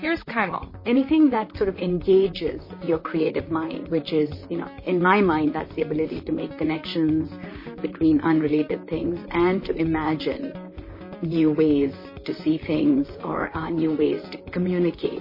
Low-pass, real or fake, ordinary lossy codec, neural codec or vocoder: 5.4 kHz; fake; MP3, 24 kbps; vocoder, 44.1 kHz, 128 mel bands, Pupu-Vocoder